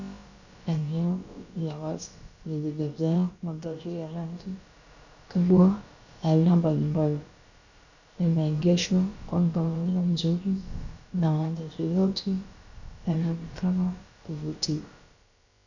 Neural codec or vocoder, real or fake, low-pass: codec, 16 kHz, about 1 kbps, DyCAST, with the encoder's durations; fake; 7.2 kHz